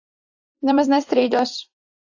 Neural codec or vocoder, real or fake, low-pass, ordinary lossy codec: vocoder, 44.1 kHz, 128 mel bands every 256 samples, BigVGAN v2; fake; 7.2 kHz; AAC, 48 kbps